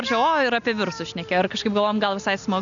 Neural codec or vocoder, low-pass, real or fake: none; 7.2 kHz; real